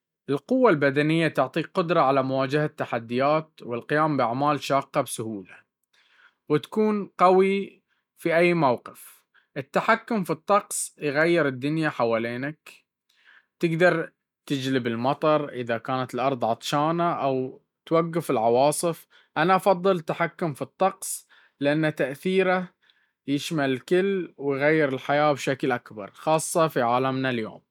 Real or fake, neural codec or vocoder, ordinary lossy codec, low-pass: real; none; none; 19.8 kHz